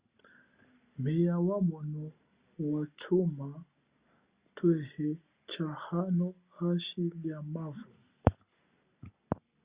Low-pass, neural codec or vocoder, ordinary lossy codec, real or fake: 3.6 kHz; none; Opus, 64 kbps; real